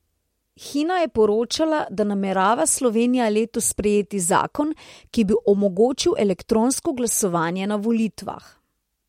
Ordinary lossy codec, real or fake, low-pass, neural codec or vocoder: MP3, 64 kbps; real; 19.8 kHz; none